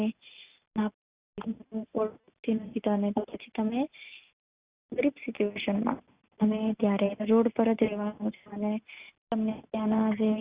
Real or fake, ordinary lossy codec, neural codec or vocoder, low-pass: real; Opus, 64 kbps; none; 3.6 kHz